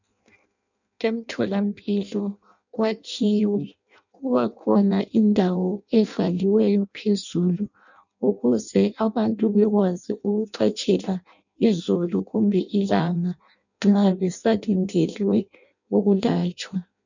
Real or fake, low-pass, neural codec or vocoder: fake; 7.2 kHz; codec, 16 kHz in and 24 kHz out, 0.6 kbps, FireRedTTS-2 codec